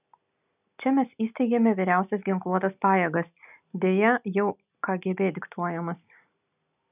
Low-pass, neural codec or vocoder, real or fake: 3.6 kHz; none; real